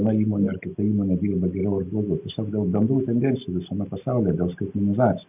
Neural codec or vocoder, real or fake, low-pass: none; real; 3.6 kHz